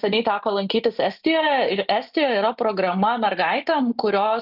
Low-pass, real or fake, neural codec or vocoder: 5.4 kHz; real; none